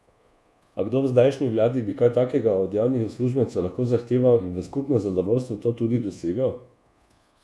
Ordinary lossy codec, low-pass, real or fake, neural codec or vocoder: none; none; fake; codec, 24 kHz, 1.2 kbps, DualCodec